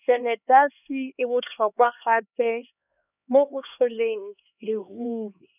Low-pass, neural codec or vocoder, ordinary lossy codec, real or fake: 3.6 kHz; codec, 16 kHz, 2 kbps, X-Codec, HuBERT features, trained on LibriSpeech; none; fake